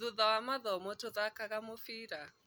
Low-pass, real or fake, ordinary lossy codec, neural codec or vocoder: none; real; none; none